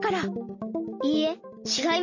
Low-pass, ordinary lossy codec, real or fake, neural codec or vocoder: 7.2 kHz; MP3, 32 kbps; real; none